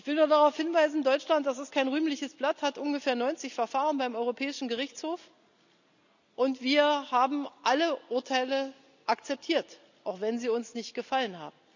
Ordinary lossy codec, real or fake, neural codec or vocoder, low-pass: none; real; none; 7.2 kHz